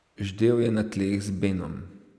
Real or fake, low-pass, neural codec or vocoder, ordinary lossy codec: real; none; none; none